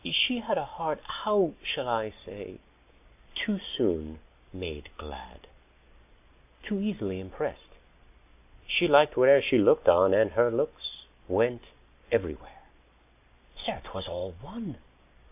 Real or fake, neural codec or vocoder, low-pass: real; none; 3.6 kHz